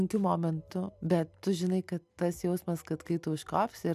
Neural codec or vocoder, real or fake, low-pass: none; real; 14.4 kHz